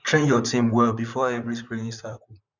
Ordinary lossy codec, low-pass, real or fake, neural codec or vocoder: none; 7.2 kHz; fake; vocoder, 44.1 kHz, 128 mel bands, Pupu-Vocoder